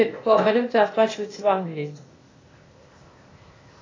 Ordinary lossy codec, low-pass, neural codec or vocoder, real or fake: AAC, 32 kbps; 7.2 kHz; codec, 16 kHz, 0.8 kbps, ZipCodec; fake